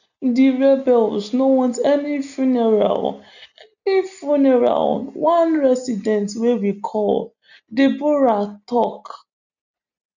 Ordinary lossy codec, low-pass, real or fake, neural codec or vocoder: none; 7.2 kHz; real; none